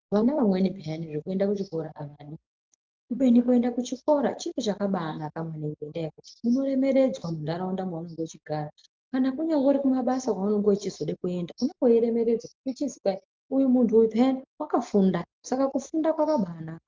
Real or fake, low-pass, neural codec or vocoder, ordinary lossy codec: real; 7.2 kHz; none; Opus, 24 kbps